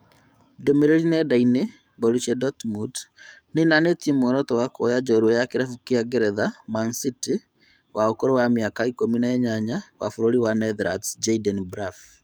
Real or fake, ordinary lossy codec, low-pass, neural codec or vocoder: fake; none; none; codec, 44.1 kHz, 7.8 kbps, DAC